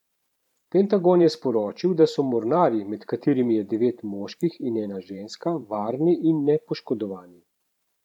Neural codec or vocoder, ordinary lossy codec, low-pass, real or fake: vocoder, 44.1 kHz, 128 mel bands every 512 samples, BigVGAN v2; none; 19.8 kHz; fake